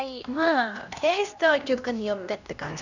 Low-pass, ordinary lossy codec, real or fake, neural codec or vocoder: 7.2 kHz; none; fake; codec, 16 kHz, 1 kbps, X-Codec, HuBERT features, trained on LibriSpeech